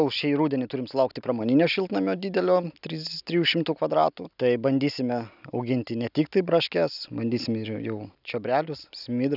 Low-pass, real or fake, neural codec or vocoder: 5.4 kHz; real; none